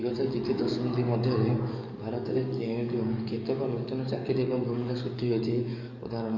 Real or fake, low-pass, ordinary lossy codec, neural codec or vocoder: fake; 7.2 kHz; none; codec, 44.1 kHz, 7.8 kbps, DAC